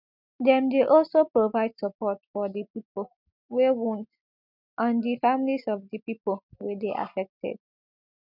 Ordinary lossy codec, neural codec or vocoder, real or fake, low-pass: none; none; real; 5.4 kHz